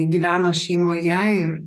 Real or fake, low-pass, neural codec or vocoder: fake; 14.4 kHz; codec, 44.1 kHz, 2.6 kbps, DAC